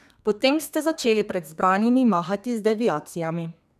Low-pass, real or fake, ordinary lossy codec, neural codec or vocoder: 14.4 kHz; fake; none; codec, 32 kHz, 1.9 kbps, SNAC